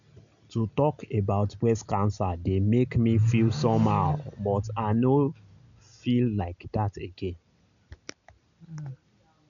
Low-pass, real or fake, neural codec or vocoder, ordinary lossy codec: 7.2 kHz; real; none; none